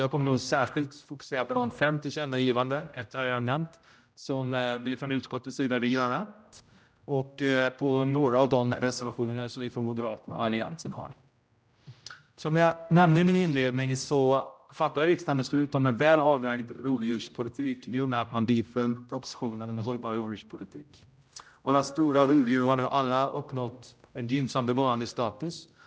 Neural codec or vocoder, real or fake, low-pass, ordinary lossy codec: codec, 16 kHz, 0.5 kbps, X-Codec, HuBERT features, trained on general audio; fake; none; none